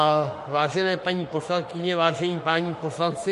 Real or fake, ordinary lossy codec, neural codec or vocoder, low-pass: fake; MP3, 48 kbps; autoencoder, 48 kHz, 32 numbers a frame, DAC-VAE, trained on Japanese speech; 14.4 kHz